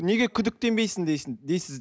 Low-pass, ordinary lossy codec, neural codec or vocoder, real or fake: none; none; none; real